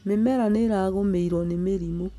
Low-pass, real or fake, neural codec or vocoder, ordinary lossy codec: 14.4 kHz; real; none; none